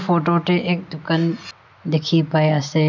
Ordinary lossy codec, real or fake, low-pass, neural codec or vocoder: none; real; 7.2 kHz; none